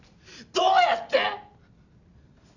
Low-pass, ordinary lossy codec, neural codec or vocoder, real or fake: 7.2 kHz; none; none; real